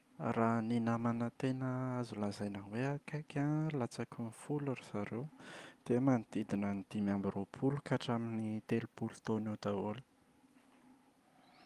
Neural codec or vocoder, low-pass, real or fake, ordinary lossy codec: none; 14.4 kHz; real; Opus, 32 kbps